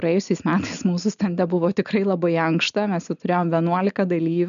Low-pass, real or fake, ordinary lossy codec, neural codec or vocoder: 7.2 kHz; real; MP3, 96 kbps; none